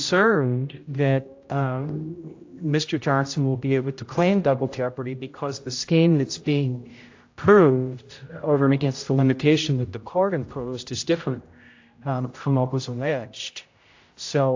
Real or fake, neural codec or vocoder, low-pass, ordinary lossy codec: fake; codec, 16 kHz, 0.5 kbps, X-Codec, HuBERT features, trained on general audio; 7.2 kHz; AAC, 48 kbps